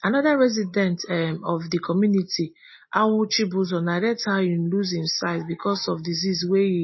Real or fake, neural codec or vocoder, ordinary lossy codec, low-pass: real; none; MP3, 24 kbps; 7.2 kHz